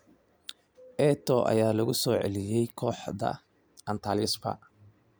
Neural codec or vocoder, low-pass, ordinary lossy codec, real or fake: none; none; none; real